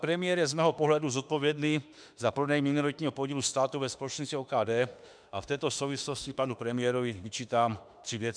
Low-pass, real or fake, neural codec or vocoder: 9.9 kHz; fake; autoencoder, 48 kHz, 32 numbers a frame, DAC-VAE, trained on Japanese speech